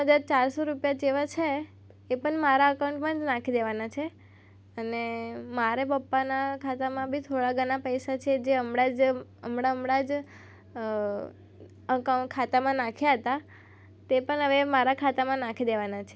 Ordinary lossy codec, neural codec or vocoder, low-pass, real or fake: none; none; none; real